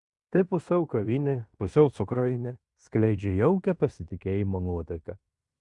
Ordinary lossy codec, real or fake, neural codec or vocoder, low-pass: Opus, 64 kbps; fake; codec, 16 kHz in and 24 kHz out, 0.9 kbps, LongCat-Audio-Codec, fine tuned four codebook decoder; 10.8 kHz